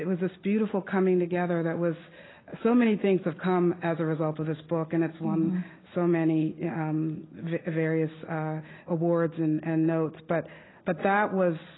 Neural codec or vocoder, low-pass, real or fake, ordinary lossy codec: none; 7.2 kHz; real; AAC, 16 kbps